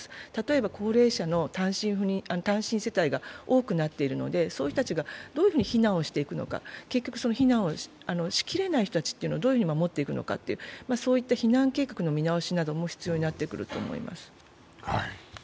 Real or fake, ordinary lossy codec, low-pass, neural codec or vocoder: real; none; none; none